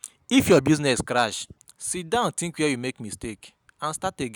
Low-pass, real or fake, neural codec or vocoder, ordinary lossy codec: none; real; none; none